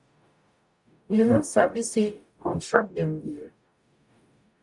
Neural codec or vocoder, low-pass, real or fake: codec, 44.1 kHz, 0.9 kbps, DAC; 10.8 kHz; fake